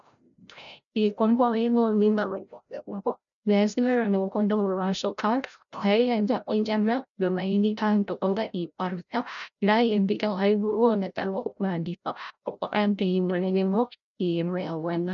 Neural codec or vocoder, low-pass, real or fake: codec, 16 kHz, 0.5 kbps, FreqCodec, larger model; 7.2 kHz; fake